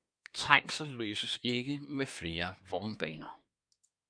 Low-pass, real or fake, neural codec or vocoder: 9.9 kHz; fake; codec, 24 kHz, 1 kbps, SNAC